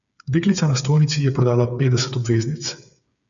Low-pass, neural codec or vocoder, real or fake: 7.2 kHz; codec, 16 kHz, 8 kbps, FreqCodec, smaller model; fake